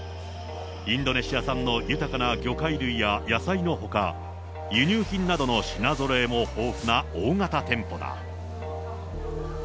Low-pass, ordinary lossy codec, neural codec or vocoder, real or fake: none; none; none; real